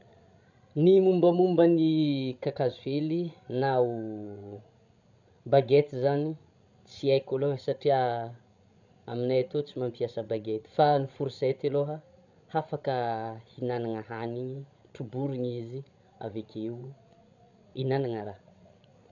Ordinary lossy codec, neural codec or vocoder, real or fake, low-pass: none; codec, 16 kHz, 16 kbps, FreqCodec, larger model; fake; 7.2 kHz